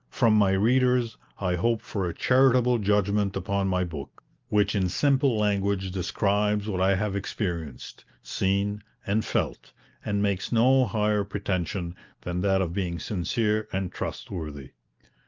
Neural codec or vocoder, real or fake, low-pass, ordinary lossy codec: none; real; 7.2 kHz; Opus, 24 kbps